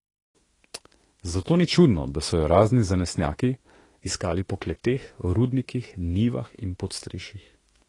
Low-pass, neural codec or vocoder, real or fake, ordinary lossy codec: 10.8 kHz; autoencoder, 48 kHz, 32 numbers a frame, DAC-VAE, trained on Japanese speech; fake; AAC, 32 kbps